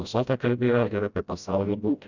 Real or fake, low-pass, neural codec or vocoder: fake; 7.2 kHz; codec, 16 kHz, 0.5 kbps, FreqCodec, smaller model